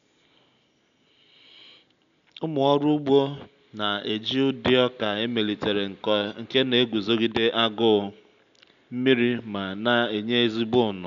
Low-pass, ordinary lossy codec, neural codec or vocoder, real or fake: 7.2 kHz; none; none; real